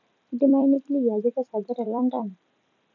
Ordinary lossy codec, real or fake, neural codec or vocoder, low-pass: none; fake; vocoder, 44.1 kHz, 128 mel bands every 256 samples, BigVGAN v2; 7.2 kHz